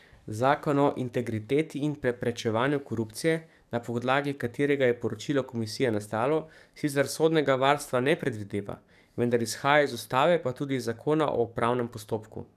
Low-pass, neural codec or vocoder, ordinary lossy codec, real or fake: 14.4 kHz; codec, 44.1 kHz, 7.8 kbps, DAC; none; fake